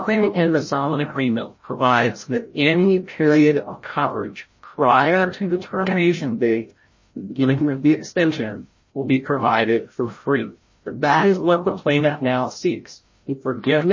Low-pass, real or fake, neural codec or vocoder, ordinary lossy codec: 7.2 kHz; fake; codec, 16 kHz, 0.5 kbps, FreqCodec, larger model; MP3, 32 kbps